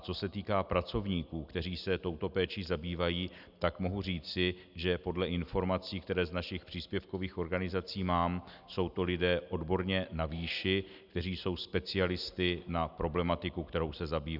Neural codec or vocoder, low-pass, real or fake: none; 5.4 kHz; real